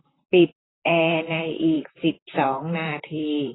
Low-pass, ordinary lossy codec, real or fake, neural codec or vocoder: 7.2 kHz; AAC, 16 kbps; fake; vocoder, 44.1 kHz, 128 mel bands, Pupu-Vocoder